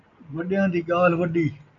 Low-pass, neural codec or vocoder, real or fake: 7.2 kHz; none; real